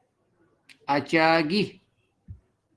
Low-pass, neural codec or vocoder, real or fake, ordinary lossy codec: 10.8 kHz; none; real; Opus, 16 kbps